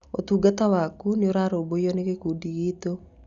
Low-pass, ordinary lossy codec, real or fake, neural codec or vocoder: 7.2 kHz; Opus, 64 kbps; real; none